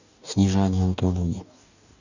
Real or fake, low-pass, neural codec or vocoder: fake; 7.2 kHz; codec, 44.1 kHz, 2.6 kbps, DAC